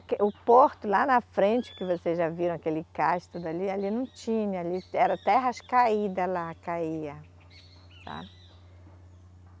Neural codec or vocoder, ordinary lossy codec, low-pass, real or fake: none; none; none; real